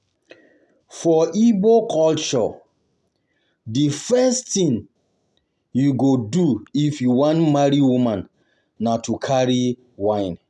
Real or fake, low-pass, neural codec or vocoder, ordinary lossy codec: real; none; none; none